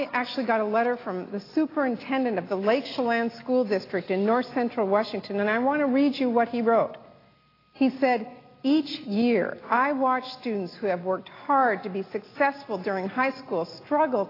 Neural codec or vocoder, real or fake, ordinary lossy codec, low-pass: none; real; AAC, 24 kbps; 5.4 kHz